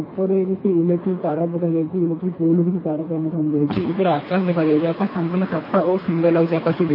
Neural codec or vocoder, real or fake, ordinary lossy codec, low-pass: codec, 24 kHz, 3 kbps, HILCodec; fake; MP3, 24 kbps; 5.4 kHz